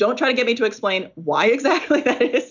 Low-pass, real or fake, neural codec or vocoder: 7.2 kHz; real; none